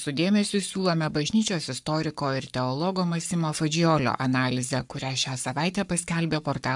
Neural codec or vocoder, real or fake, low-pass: codec, 44.1 kHz, 7.8 kbps, Pupu-Codec; fake; 10.8 kHz